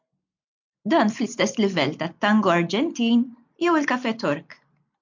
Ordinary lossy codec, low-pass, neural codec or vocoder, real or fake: MP3, 48 kbps; 7.2 kHz; none; real